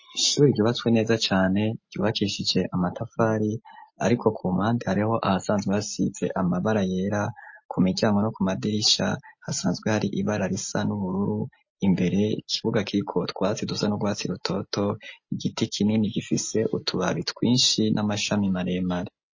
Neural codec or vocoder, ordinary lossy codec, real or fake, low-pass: none; MP3, 32 kbps; real; 7.2 kHz